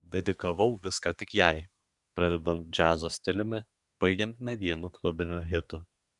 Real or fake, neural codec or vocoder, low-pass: fake; codec, 24 kHz, 1 kbps, SNAC; 10.8 kHz